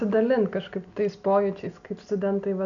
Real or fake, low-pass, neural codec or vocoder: real; 7.2 kHz; none